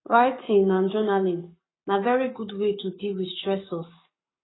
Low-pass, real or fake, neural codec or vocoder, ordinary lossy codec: 7.2 kHz; fake; codec, 44.1 kHz, 7.8 kbps, Pupu-Codec; AAC, 16 kbps